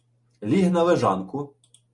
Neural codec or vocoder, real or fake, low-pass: none; real; 10.8 kHz